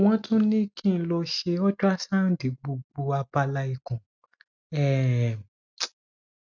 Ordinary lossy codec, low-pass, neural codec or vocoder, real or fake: none; 7.2 kHz; none; real